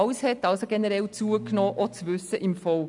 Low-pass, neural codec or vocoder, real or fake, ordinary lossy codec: 10.8 kHz; none; real; none